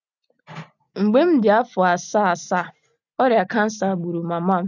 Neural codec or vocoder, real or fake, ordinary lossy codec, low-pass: none; real; none; 7.2 kHz